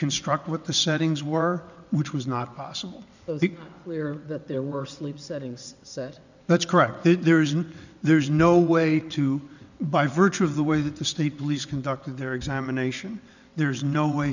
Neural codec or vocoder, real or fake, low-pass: vocoder, 22.05 kHz, 80 mel bands, WaveNeXt; fake; 7.2 kHz